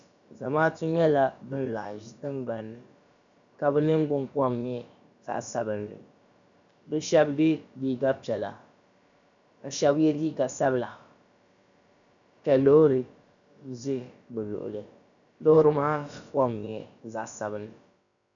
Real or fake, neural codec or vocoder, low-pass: fake; codec, 16 kHz, about 1 kbps, DyCAST, with the encoder's durations; 7.2 kHz